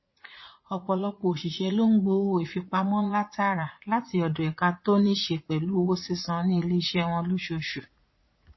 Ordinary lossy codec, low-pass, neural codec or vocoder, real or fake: MP3, 24 kbps; 7.2 kHz; vocoder, 44.1 kHz, 80 mel bands, Vocos; fake